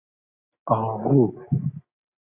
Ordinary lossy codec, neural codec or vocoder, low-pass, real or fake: Opus, 64 kbps; none; 3.6 kHz; real